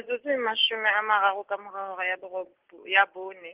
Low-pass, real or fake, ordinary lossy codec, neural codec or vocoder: 3.6 kHz; real; Opus, 16 kbps; none